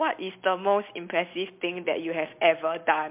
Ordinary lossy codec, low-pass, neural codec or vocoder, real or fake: MP3, 32 kbps; 3.6 kHz; none; real